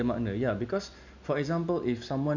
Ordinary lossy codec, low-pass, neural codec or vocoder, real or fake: none; 7.2 kHz; none; real